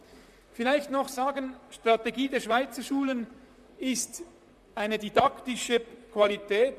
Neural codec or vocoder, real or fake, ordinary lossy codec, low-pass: vocoder, 44.1 kHz, 128 mel bands, Pupu-Vocoder; fake; MP3, 96 kbps; 14.4 kHz